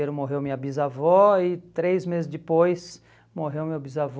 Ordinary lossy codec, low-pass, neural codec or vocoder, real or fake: none; none; none; real